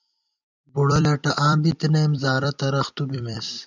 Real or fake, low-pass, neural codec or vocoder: fake; 7.2 kHz; vocoder, 44.1 kHz, 128 mel bands every 256 samples, BigVGAN v2